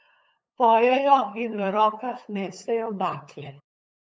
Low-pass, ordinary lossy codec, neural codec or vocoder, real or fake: none; none; codec, 16 kHz, 8 kbps, FunCodec, trained on LibriTTS, 25 frames a second; fake